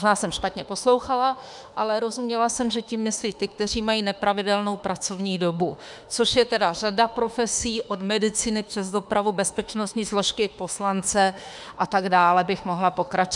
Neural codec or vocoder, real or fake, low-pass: autoencoder, 48 kHz, 32 numbers a frame, DAC-VAE, trained on Japanese speech; fake; 10.8 kHz